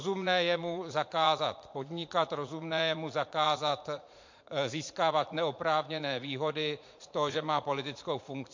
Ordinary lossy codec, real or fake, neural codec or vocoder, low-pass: MP3, 48 kbps; fake; vocoder, 44.1 kHz, 80 mel bands, Vocos; 7.2 kHz